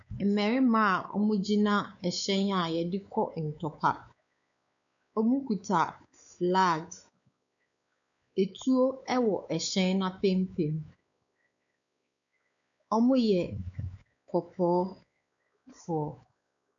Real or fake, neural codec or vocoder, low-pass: fake; codec, 16 kHz, 4 kbps, X-Codec, WavLM features, trained on Multilingual LibriSpeech; 7.2 kHz